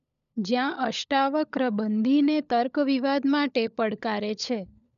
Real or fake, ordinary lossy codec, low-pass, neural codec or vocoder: fake; none; 7.2 kHz; codec, 16 kHz, 16 kbps, FunCodec, trained on LibriTTS, 50 frames a second